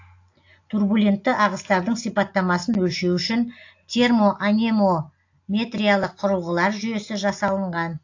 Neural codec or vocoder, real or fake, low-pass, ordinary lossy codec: none; real; 7.2 kHz; AAC, 48 kbps